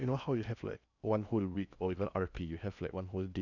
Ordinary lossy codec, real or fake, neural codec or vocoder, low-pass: none; fake; codec, 16 kHz in and 24 kHz out, 0.6 kbps, FocalCodec, streaming, 2048 codes; 7.2 kHz